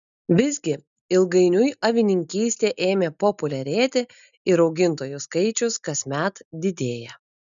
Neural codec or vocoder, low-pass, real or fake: none; 7.2 kHz; real